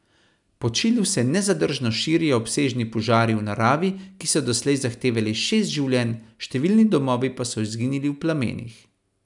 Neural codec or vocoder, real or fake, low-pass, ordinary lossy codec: none; real; 10.8 kHz; none